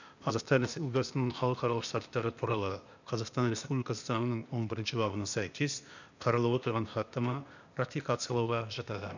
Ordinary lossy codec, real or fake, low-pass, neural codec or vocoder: none; fake; 7.2 kHz; codec, 16 kHz, 0.8 kbps, ZipCodec